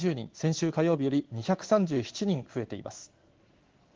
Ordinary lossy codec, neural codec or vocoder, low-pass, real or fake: Opus, 16 kbps; codec, 16 kHz in and 24 kHz out, 1 kbps, XY-Tokenizer; 7.2 kHz; fake